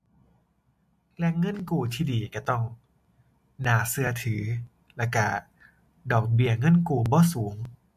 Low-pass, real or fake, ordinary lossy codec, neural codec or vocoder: 14.4 kHz; real; MP3, 64 kbps; none